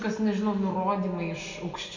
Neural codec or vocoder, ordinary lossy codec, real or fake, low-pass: none; MP3, 64 kbps; real; 7.2 kHz